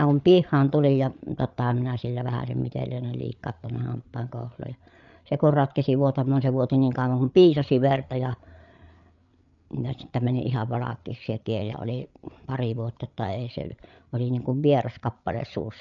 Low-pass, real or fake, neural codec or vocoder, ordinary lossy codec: 7.2 kHz; fake; codec, 16 kHz, 8 kbps, FreqCodec, larger model; none